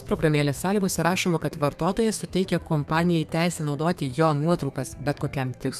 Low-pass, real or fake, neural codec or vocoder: 14.4 kHz; fake; codec, 32 kHz, 1.9 kbps, SNAC